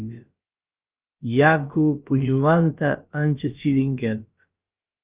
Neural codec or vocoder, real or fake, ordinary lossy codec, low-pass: codec, 16 kHz, about 1 kbps, DyCAST, with the encoder's durations; fake; Opus, 24 kbps; 3.6 kHz